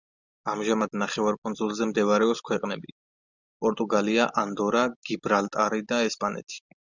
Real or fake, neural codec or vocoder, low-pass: real; none; 7.2 kHz